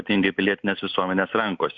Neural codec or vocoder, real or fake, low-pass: none; real; 7.2 kHz